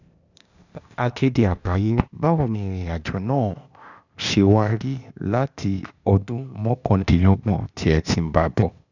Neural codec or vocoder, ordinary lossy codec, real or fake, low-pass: codec, 16 kHz, 0.8 kbps, ZipCodec; none; fake; 7.2 kHz